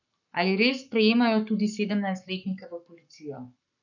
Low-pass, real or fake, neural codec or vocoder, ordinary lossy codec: 7.2 kHz; fake; codec, 44.1 kHz, 7.8 kbps, Pupu-Codec; none